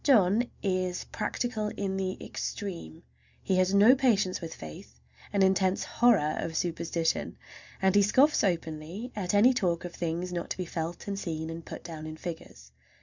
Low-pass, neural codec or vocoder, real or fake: 7.2 kHz; none; real